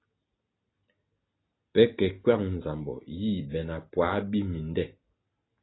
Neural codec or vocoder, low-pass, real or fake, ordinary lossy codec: none; 7.2 kHz; real; AAC, 16 kbps